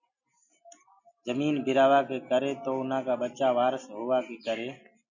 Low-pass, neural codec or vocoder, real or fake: 7.2 kHz; none; real